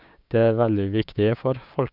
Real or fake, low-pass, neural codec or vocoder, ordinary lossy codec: fake; 5.4 kHz; codec, 16 kHz, 6 kbps, DAC; none